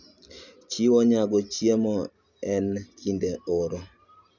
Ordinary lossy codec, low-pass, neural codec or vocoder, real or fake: none; 7.2 kHz; none; real